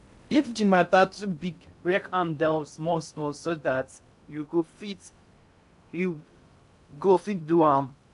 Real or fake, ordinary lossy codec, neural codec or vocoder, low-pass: fake; none; codec, 16 kHz in and 24 kHz out, 0.6 kbps, FocalCodec, streaming, 2048 codes; 10.8 kHz